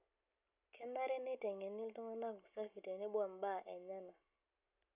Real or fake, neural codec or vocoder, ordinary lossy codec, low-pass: real; none; none; 3.6 kHz